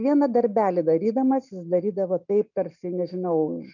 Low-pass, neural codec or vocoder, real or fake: 7.2 kHz; none; real